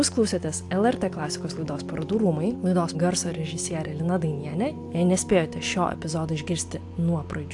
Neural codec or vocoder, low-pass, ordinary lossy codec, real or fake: none; 10.8 kHz; AAC, 64 kbps; real